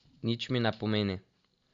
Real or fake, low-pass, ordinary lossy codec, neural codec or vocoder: real; 7.2 kHz; AAC, 64 kbps; none